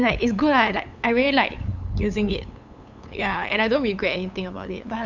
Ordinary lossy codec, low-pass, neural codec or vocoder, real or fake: none; 7.2 kHz; codec, 16 kHz, 8 kbps, FunCodec, trained on LibriTTS, 25 frames a second; fake